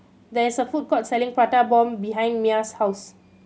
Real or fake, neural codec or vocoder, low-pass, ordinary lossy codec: real; none; none; none